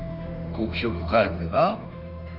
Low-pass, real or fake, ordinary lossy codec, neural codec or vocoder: 5.4 kHz; fake; none; autoencoder, 48 kHz, 32 numbers a frame, DAC-VAE, trained on Japanese speech